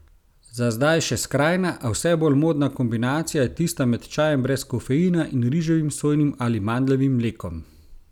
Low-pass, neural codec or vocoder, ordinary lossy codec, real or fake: 19.8 kHz; none; none; real